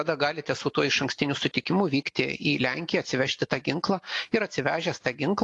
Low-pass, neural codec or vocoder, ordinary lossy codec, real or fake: 10.8 kHz; none; AAC, 48 kbps; real